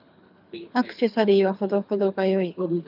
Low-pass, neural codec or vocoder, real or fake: 5.4 kHz; codec, 24 kHz, 6 kbps, HILCodec; fake